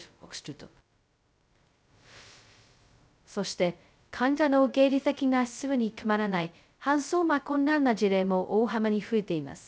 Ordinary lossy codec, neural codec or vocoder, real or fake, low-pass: none; codec, 16 kHz, 0.2 kbps, FocalCodec; fake; none